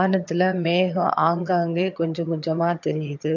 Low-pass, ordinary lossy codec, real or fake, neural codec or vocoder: 7.2 kHz; MP3, 48 kbps; fake; vocoder, 22.05 kHz, 80 mel bands, HiFi-GAN